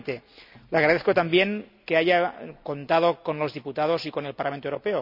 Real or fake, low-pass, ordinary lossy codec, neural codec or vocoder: real; 5.4 kHz; none; none